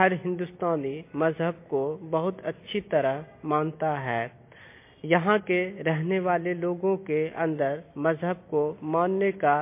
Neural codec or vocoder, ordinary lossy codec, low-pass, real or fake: none; MP3, 24 kbps; 3.6 kHz; real